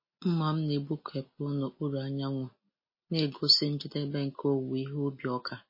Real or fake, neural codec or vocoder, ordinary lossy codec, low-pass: real; none; MP3, 24 kbps; 5.4 kHz